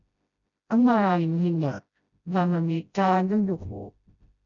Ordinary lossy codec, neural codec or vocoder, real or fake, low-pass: MP3, 96 kbps; codec, 16 kHz, 0.5 kbps, FreqCodec, smaller model; fake; 7.2 kHz